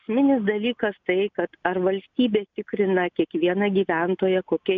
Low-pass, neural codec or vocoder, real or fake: 7.2 kHz; none; real